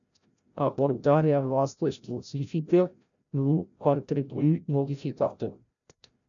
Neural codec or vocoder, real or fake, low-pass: codec, 16 kHz, 0.5 kbps, FreqCodec, larger model; fake; 7.2 kHz